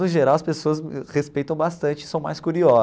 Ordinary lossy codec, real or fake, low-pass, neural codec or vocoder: none; real; none; none